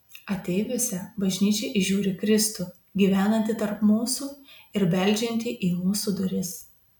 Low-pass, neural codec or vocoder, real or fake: 19.8 kHz; none; real